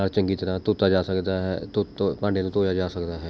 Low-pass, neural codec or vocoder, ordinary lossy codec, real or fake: none; none; none; real